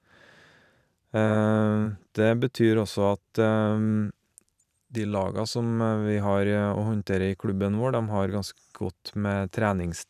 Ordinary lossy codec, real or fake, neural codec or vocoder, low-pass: none; fake; vocoder, 44.1 kHz, 128 mel bands every 512 samples, BigVGAN v2; 14.4 kHz